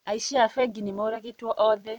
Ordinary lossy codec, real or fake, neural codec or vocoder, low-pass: none; fake; vocoder, 44.1 kHz, 128 mel bands every 256 samples, BigVGAN v2; 19.8 kHz